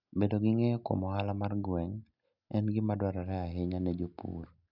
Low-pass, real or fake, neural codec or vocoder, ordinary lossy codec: 5.4 kHz; real; none; none